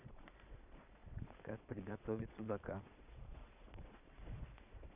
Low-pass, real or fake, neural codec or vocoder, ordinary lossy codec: 3.6 kHz; fake; vocoder, 22.05 kHz, 80 mel bands, Vocos; none